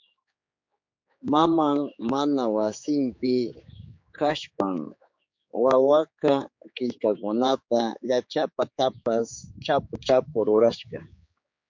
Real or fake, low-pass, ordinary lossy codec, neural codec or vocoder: fake; 7.2 kHz; MP3, 48 kbps; codec, 16 kHz, 4 kbps, X-Codec, HuBERT features, trained on general audio